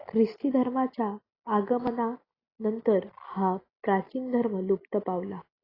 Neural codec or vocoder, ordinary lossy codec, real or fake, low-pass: none; AAC, 24 kbps; real; 5.4 kHz